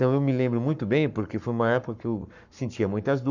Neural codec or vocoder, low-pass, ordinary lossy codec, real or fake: codec, 44.1 kHz, 7.8 kbps, Pupu-Codec; 7.2 kHz; none; fake